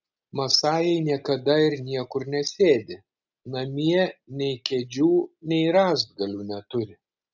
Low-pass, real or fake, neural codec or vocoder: 7.2 kHz; real; none